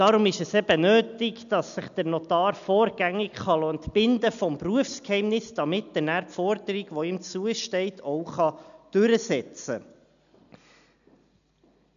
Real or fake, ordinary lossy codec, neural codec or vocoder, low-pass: real; MP3, 96 kbps; none; 7.2 kHz